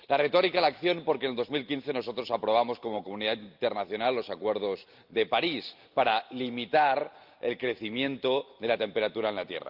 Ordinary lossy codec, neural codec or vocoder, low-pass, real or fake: Opus, 24 kbps; none; 5.4 kHz; real